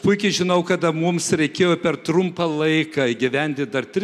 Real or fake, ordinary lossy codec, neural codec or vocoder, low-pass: real; MP3, 96 kbps; none; 14.4 kHz